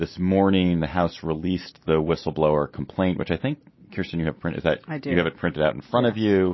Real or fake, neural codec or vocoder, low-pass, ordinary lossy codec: real; none; 7.2 kHz; MP3, 24 kbps